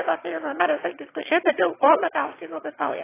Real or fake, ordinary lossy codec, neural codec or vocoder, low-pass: fake; AAC, 16 kbps; autoencoder, 22.05 kHz, a latent of 192 numbers a frame, VITS, trained on one speaker; 3.6 kHz